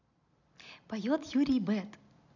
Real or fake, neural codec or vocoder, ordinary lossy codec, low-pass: real; none; none; 7.2 kHz